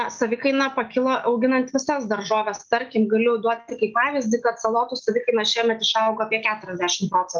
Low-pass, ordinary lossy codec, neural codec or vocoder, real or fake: 7.2 kHz; Opus, 24 kbps; none; real